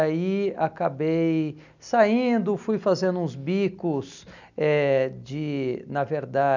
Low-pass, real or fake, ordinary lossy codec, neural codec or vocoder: 7.2 kHz; real; none; none